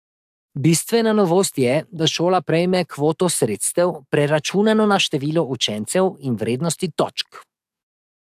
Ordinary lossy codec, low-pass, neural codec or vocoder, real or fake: AAC, 96 kbps; 14.4 kHz; codec, 44.1 kHz, 7.8 kbps, DAC; fake